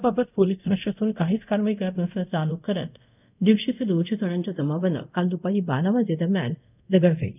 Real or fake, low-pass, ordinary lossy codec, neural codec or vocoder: fake; 3.6 kHz; none; codec, 24 kHz, 0.5 kbps, DualCodec